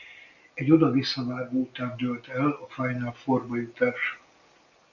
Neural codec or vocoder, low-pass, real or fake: none; 7.2 kHz; real